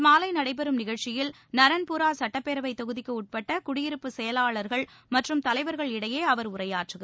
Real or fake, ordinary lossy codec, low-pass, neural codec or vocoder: real; none; none; none